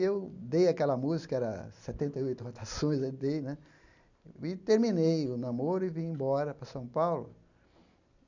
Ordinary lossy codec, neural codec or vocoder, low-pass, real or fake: none; none; 7.2 kHz; real